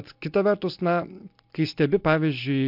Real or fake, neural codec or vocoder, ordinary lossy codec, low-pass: real; none; MP3, 48 kbps; 5.4 kHz